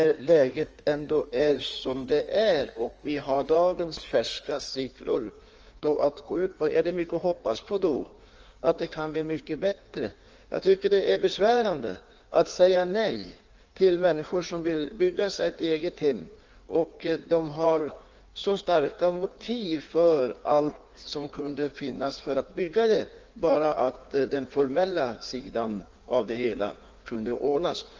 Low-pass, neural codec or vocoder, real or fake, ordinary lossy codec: 7.2 kHz; codec, 16 kHz in and 24 kHz out, 1.1 kbps, FireRedTTS-2 codec; fake; Opus, 24 kbps